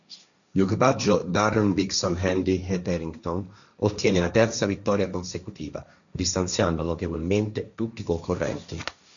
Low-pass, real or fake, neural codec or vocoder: 7.2 kHz; fake; codec, 16 kHz, 1.1 kbps, Voila-Tokenizer